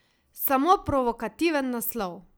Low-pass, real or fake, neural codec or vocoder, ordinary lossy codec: none; real; none; none